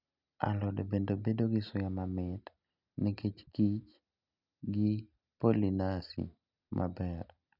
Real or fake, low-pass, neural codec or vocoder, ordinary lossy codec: real; 5.4 kHz; none; none